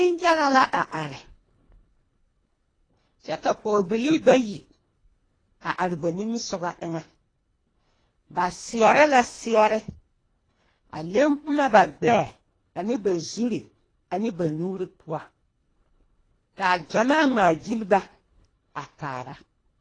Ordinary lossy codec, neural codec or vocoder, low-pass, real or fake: AAC, 32 kbps; codec, 24 kHz, 1.5 kbps, HILCodec; 9.9 kHz; fake